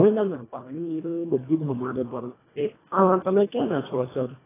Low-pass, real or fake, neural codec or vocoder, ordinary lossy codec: 3.6 kHz; fake; codec, 24 kHz, 1.5 kbps, HILCodec; AAC, 16 kbps